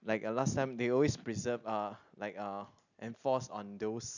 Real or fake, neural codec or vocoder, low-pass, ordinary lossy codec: real; none; 7.2 kHz; none